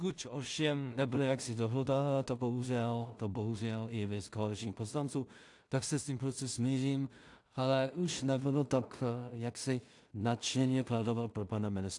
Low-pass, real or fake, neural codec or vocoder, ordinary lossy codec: 10.8 kHz; fake; codec, 16 kHz in and 24 kHz out, 0.4 kbps, LongCat-Audio-Codec, two codebook decoder; AAC, 64 kbps